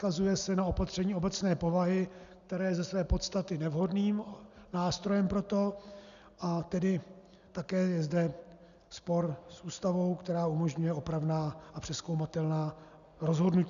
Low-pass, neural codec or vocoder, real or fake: 7.2 kHz; none; real